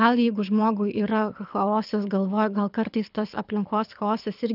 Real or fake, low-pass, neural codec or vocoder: fake; 5.4 kHz; codec, 24 kHz, 6 kbps, HILCodec